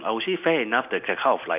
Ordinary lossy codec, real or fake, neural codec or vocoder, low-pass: none; real; none; 3.6 kHz